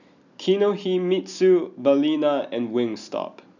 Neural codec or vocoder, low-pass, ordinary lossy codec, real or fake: none; 7.2 kHz; none; real